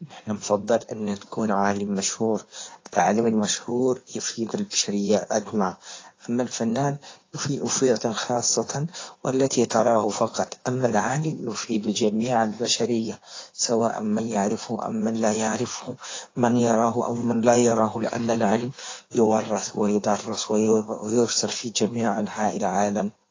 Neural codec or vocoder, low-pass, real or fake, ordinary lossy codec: codec, 16 kHz in and 24 kHz out, 1.1 kbps, FireRedTTS-2 codec; 7.2 kHz; fake; AAC, 32 kbps